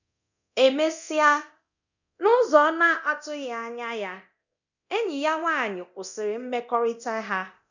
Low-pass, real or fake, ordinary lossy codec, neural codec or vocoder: 7.2 kHz; fake; none; codec, 24 kHz, 0.9 kbps, DualCodec